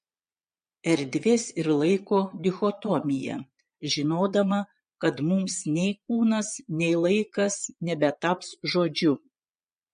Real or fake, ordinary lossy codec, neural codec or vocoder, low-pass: fake; MP3, 48 kbps; vocoder, 22.05 kHz, 80 mel bands, Vocos; 9.9 kHz